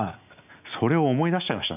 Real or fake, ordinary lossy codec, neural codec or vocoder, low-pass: real; none; none; 3.6 kHz